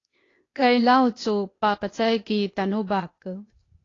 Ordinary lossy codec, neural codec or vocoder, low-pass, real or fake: AAC, 32 kbps; codec, 16 kHz, 0.8 kbps, ZipCodec; 7.2 kHz; fake